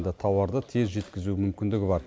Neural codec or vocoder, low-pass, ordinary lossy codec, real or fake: none; none; none; real